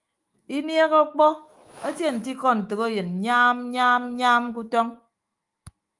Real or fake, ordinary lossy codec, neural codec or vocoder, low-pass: fake; Opus, 32 kbps; autoencoder, 48 kHz, 128 numbers a frame, DAC-VAE, trained on Japanese speech; 10.8 kHz